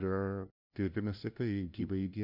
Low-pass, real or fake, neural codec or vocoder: 5.4 kHz; fake; codec, 16 kHz, 0.5 kbps, FunCodec, trained on LibriTTS, 25 frames a second